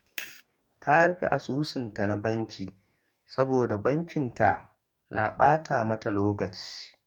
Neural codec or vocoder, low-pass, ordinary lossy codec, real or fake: codec, 44.1 kHz, 2.6 kbps, DAC; 19.8 kHz; MP3, 96 kbps; fake